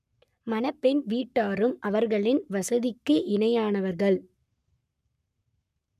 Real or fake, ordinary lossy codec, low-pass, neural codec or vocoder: fake; none; 14.4 kHz; codec, 44.1 kHz, 7.8 kbps, Pupu-Codec